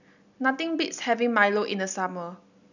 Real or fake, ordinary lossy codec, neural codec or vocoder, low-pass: real; none; none; 7.2 kHz